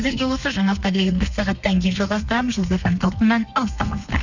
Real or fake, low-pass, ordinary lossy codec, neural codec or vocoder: fake; 7.2 kHz; none; codec, 32 kHz, 1.9 kbps, SNAC